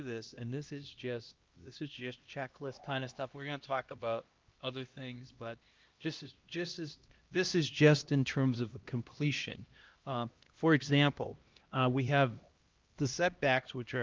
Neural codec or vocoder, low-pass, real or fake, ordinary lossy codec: codec, 16 kHz, 1 kbps, X-Codec, HuBERT features, trained on LibriSpeech; 7.2 kHz; fake; Opus, 32 kbps